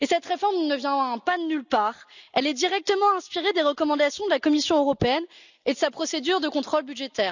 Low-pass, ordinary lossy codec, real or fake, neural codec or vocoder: 7.2 kHz; none; real; none